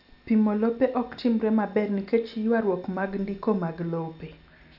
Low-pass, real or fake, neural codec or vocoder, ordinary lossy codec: 5.4 kHz; real; none; none